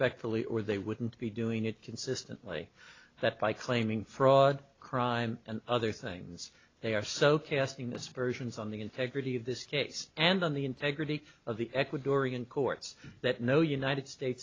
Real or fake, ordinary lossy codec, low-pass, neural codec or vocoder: real; AAC, 32 kbps; 7.2 kHz; none